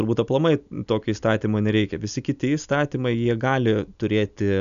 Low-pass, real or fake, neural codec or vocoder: 7.2 kHz; real; none